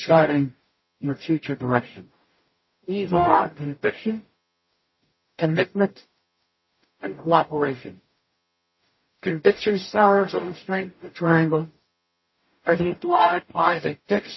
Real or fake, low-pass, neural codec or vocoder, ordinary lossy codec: fake; 7.2 kHz; codec, 44.1 kHz, 0.9 kbps, DAC; MP3, 24 kbps